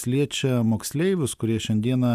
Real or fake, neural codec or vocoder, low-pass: real; none; 14.4 kHz